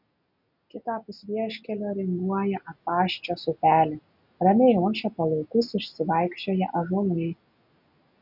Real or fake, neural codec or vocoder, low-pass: real; none; 5.4 kHz